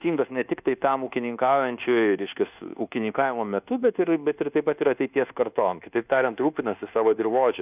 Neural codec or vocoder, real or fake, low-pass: codec, 24 kHz, 1.2 kbps, DualCodec; fake; 3.6 kHz